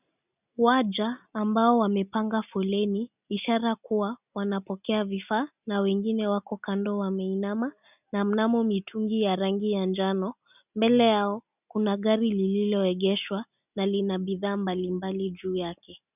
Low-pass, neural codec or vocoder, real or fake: 3.6 kHz; none; real